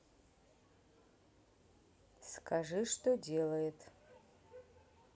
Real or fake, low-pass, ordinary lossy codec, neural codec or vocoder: real; none; none; none